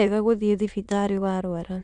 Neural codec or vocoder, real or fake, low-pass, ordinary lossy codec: autoencoder, 22.05 kHz, a latent of 192 numbers a frame, VITS, trained on many speakers; fake; 9.9 kHz; none